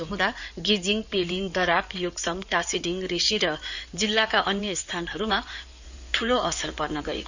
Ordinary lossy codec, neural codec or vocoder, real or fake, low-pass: none; codec, 16 kHz in and 24 kHz out, 2.2 kbps, FireRedTTS-2 codec; fake; 7.2 kHz